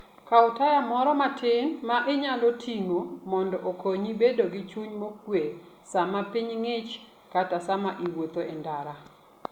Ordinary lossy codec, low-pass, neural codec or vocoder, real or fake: Opus, 64 kbps; 19.8 kHz; none; real